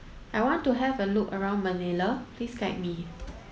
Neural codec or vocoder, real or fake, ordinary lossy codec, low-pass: none; real; none; none